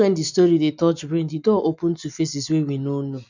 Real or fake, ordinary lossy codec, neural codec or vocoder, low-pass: real; none; none; 7.2 kHz